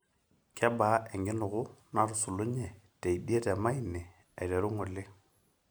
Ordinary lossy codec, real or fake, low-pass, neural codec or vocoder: none; real; none; none